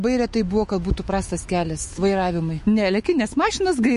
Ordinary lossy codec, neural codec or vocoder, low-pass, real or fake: MP3, 48 kbps; autoencoder, 48 kHz, 128 numbers a frame, DAC-VAE, trained on Japanese speech; 14.4 kHz; fake